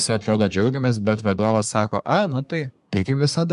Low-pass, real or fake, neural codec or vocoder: 10.8 kHz; fake; codec, 24 kHz, 1 kbps, SNAC